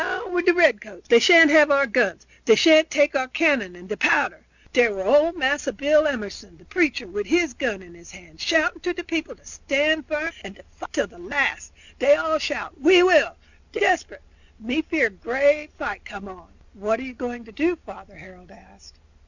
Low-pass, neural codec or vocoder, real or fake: 7.2 kHz; none; real